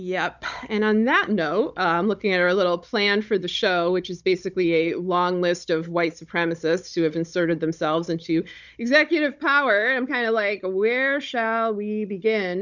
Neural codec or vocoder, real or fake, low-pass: codec, 16 kHz, 16 kbps, FunCodec, trained on Chinese and English, 50 frames a second; fake; 7.2 kHz